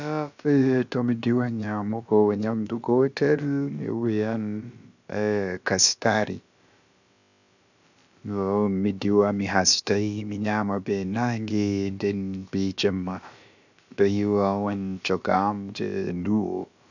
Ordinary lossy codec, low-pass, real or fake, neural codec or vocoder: none; 7.2 kHz; fake; codec, 16 kHz, about 1 kbps, DyCAST, with the encoder's durations